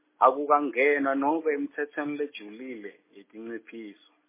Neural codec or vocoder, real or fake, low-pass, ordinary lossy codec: none; real; 3.6 kHz; MP3, 16 kbps